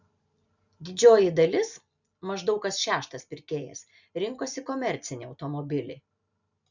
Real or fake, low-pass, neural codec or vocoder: real; 7.2 kHz; none